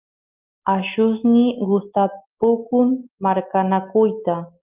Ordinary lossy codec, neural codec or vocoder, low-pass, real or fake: Opus, 24 kbps; none; 3.6 kHz; real